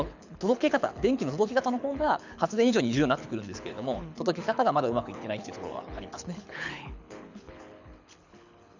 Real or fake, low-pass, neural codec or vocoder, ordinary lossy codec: fake; 7.2 kHz; codec, 24 kHz, 6 kbps, HILCodec; none